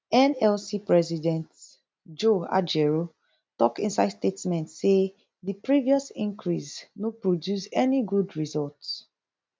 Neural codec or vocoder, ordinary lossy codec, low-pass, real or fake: none; none; none; real